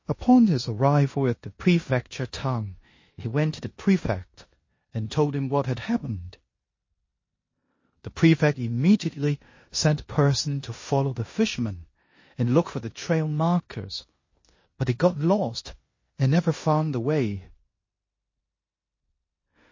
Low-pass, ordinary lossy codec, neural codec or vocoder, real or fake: 7.2 kHz; MP3, 32 kbps; codec, 16 kHz in and 24 kHz out, 0.9 kbps, LongCat-Audio-Codec, four codebook decoder; fake